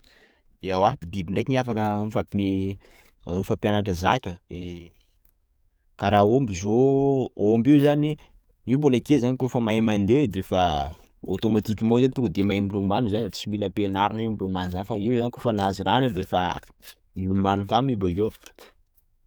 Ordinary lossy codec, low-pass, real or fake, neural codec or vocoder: none; none; fake; codec, 44.1 kHz, 7.8 kbps, DAC